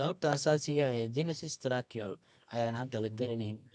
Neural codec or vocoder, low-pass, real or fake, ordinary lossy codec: codec, 24 kHz, 0.9 kbps, WavTokenizer, medium music audio release; 10.8 kHz; fake; none